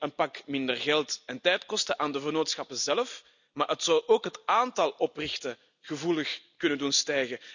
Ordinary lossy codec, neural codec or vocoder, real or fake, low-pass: none; none; real; 7.2 kHz